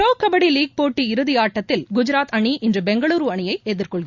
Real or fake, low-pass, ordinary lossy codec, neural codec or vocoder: real; 7.2 kHz; Opus, 64 kbps; none